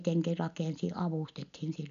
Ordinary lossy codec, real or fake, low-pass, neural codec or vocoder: none; real; 7.2 kHz; none